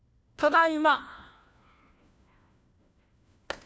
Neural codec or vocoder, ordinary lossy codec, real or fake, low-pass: codec, 16 kHz, 1 kbps, FunCodec, trained on LibriTTS, 50 frames a second; none; fake; none